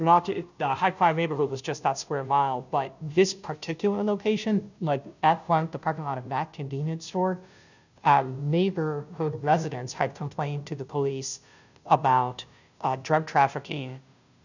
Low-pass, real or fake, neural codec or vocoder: 7.2 kHz; fake; codec, 16 kHz, 0.5 kbps, FunCodec, trained on Chinese and English, 25 frames a second